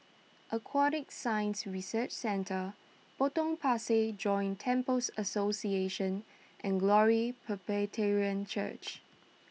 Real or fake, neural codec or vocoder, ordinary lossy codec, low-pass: real; none; none; none